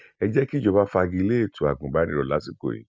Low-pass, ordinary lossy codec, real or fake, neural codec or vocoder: none; none; real; none